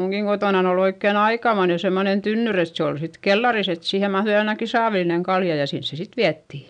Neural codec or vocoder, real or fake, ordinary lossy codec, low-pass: none; real; none; 9.9 kHz